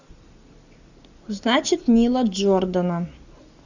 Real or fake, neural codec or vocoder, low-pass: real; none; 7.2 kHz